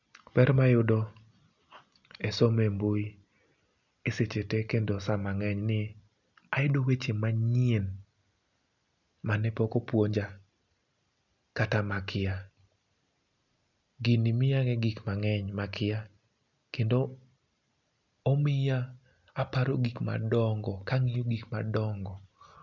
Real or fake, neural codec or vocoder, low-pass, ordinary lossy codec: real; none; 7.2 kHz; none